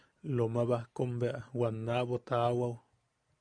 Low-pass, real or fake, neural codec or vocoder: 9.9 kHz; real; none